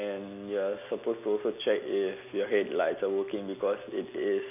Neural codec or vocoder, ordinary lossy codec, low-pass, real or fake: none; none; 3.6 kHz; real